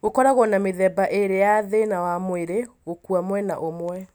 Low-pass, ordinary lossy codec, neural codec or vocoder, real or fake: none; none; none; real